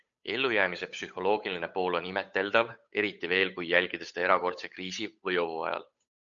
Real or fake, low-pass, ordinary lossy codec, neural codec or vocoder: fake; 7.2 kHz; MP3, 64 kbps; codec, 16 kHz, 8 kbps, FunCodec, trained on Chinese and English, 25 frames a second